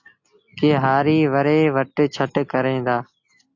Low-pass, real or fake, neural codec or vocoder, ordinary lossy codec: 7.2 kHz; real; none; AAC, 48 kbps